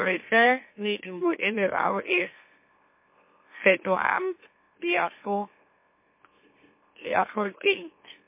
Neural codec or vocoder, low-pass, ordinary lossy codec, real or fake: autoencoder, 44.1 kHz, a latent of 192 numbers a frame, MeloTTS; 3.6 kHz; MP3, 24 kbps; fake